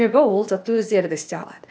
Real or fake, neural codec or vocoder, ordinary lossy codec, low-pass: fake; codec, 16 kHz, 0.8 kbps, ZipCodec; none; none